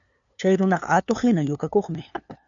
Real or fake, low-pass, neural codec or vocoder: fake; 7.2 kHz; codec, 16 kHz, 2 kbps, FunCodec, trained on LibriTTS, 25 frames a second